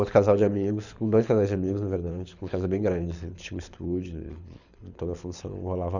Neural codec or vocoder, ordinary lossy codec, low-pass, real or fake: codec, 24 kHz, 6 kbps, HILCodec; none; 7.2 kHz; fake